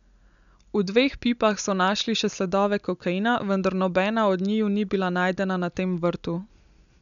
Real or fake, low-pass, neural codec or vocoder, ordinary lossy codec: real; 7.2 kHz; none; none